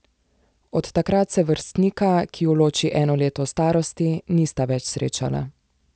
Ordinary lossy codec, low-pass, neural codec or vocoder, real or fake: none; none; none; real